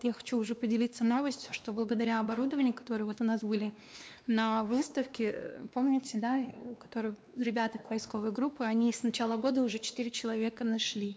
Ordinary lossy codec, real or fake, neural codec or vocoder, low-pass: none; fake; codec, 16 kHz, 2 kbps, X-Codec, WavLM features, trained on Multilingual LibriSpeech; none